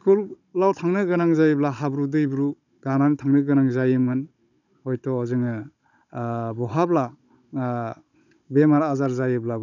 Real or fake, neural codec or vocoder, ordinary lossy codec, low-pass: fake; codec, 16 kHz, 16 kbps, FunCodec, trained on Chinese and English, 50 frames a second; none; 7.2 kHz